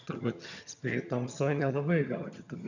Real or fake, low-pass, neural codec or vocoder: fake; 7.2 kHz; vocoder, 22.05 kHz, 80 mel bands, HiFi-GAN